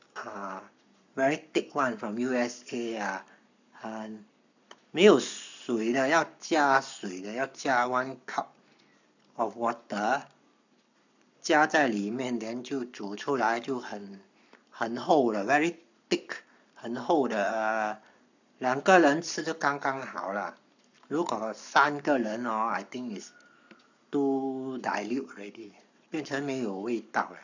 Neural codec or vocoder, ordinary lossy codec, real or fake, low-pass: codec, 44.1 kHz, 7.8 kbps, Pupu-Codec; none; fake; 7.2 kHz